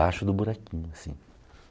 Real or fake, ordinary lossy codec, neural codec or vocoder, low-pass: real; none; none; none